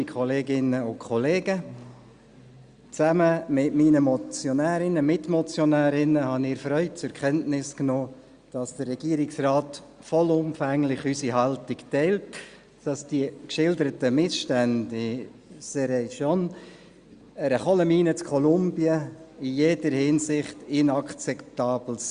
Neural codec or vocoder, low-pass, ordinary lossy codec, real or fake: none; 9.9 kHz; Opus, 64 kbps; real